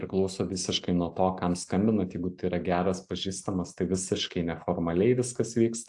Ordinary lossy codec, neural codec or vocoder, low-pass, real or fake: AAC, 64 kbps; none; 10.8 kHz; real